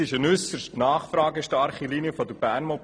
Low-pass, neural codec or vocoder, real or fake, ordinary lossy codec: 9.9 kHz; none; real; none